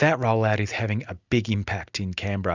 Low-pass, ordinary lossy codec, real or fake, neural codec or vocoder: 7.2 kHz; Opus, 64 kbps; real; none